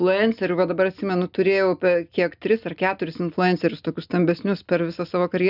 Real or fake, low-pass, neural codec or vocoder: real; 5.4 kHz; none